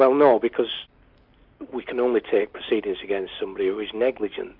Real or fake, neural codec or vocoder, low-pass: real; none; 5.4 kHz